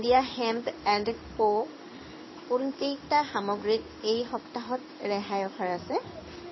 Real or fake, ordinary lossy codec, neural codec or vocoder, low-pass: fake; MP3, 24 kbps; codec, 16 kHz in and 24 kHz out, 1 kbps, XY-Tokenizer; 7.2 kHz